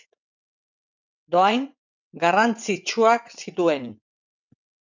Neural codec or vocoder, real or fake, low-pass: vocoder, 22.05 kHz, 80 mel bands, WaveNeXt; fake; 7.2 kHz